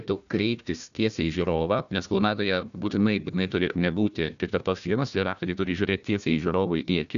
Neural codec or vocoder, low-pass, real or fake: codec, 16 kHz, 1 kbps, FunCodec, trained on Chinese and English, 50 frames a second; 7.2 kHz; fake